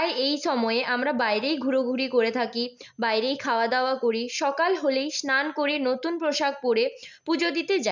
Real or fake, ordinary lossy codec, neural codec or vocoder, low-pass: real; none; none; 7.2 kHz